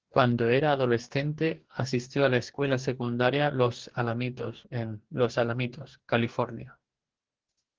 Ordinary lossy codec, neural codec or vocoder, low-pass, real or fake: Opus, 16 kbps; codec, 44.1 kHz, 2.6 kbps, DAC; 7.2 kHz; fake